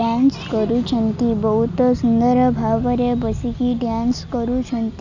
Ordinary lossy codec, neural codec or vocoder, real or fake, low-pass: none; none; real; 7.2 kHz